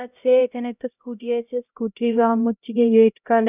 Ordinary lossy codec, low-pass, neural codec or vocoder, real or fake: none; 3.6 kHz; codec, 16 kHz, 0.5 kbps, X-Codec, HuBERT features, trained on LibriSpeech; fake